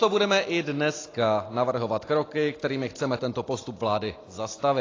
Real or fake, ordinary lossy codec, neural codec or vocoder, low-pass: real; AAC, 32 kbps; none; 7.2 kHz